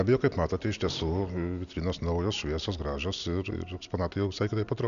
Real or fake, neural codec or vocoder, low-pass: real; none; 7.2 kHz